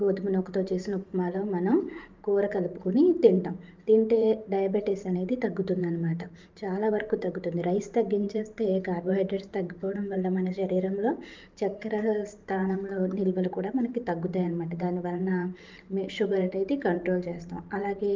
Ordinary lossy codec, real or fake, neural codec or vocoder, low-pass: Opus, 32 kbps; fake; vocoder, 44.1 kHz, 128 mel bands every 512 samples, BigVGAN v2; 7.2 kHz